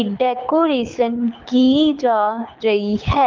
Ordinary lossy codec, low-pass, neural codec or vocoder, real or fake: Opus, 24 kbps; 7.2 kHz; codec, 24 kHz, 6 kbps, HILCodec; fake